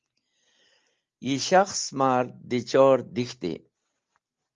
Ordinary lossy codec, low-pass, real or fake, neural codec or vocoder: Opus, 32 kbps; 7.2 kHz; real; none